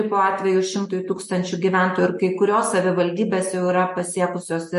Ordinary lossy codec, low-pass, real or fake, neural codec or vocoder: MP3, 48 kbps; 14.4 kHz; real; none